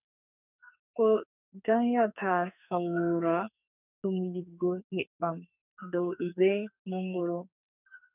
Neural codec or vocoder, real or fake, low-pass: codec, 44.1 kHz, 2.6 kbps, SNAC; fake; 3.6 kHz